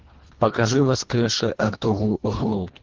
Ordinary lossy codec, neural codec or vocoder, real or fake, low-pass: Opus, 16 kbps; codec, 24 kHz, 1.5 kbps, HILCodec; fake; 7.2 kHz